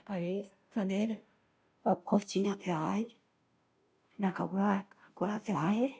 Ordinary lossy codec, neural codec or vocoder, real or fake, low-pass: none; codec, 16 kHz, 0.5 kbps, FunCodec, trained on Chinese and English, 25 frames a second; fake; none